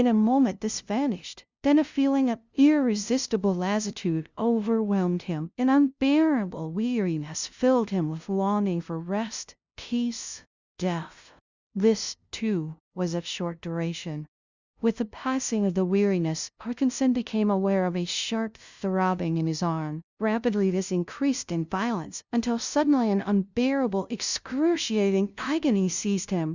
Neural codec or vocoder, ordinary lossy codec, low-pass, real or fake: codec, 16 kHz, 0.5 kbps, FunCodec, trained on LibriTTS, 25 frames a second; Opus, 64 kbps; 7.2 kHz; fake